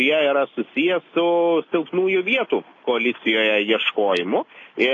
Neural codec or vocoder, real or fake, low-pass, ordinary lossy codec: none; real; 7.2 kHz; AAC, 32 kbps